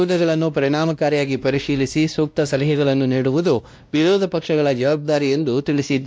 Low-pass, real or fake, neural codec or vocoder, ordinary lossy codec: none; fake; codec, 16 kHz, 1 kbps, X-Codec, WavLM features, trained on Multilingual LibriSpeech; none